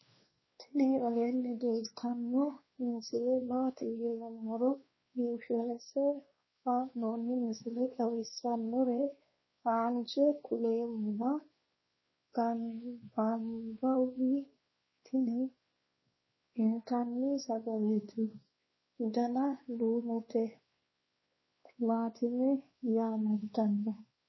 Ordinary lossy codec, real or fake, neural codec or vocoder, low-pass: MP3, 24 kbps; fake; codec, 16 kHz, 2 kbps, X-Codec, WavLM features, trained on Multilingual LibriSpeech; 7.2 kHz